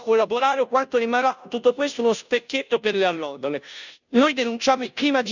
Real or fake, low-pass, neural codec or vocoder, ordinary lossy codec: fake; 7.2 kHz; codec, 16 kHz, 0.5 kbps, FunCodec, trained on Chinese and English, 25 frames a second; none